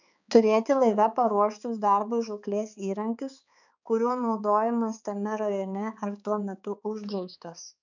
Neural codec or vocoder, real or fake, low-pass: codec, 16 kHz, 4 kbps, X-Codec, HuBERT features, trained on balanced general audio; fake; 7.2 kHz